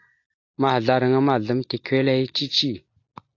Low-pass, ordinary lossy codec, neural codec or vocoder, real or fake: 7.2 kHz; AAC, 48 kbps; none; real